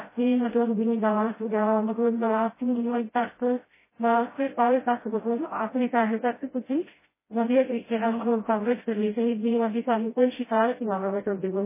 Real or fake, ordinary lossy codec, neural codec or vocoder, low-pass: fake; MP3, 16 kbps; codec, 16 kHz, 0.5 kbps, FreqCodec, smaller model; 3.6 kHz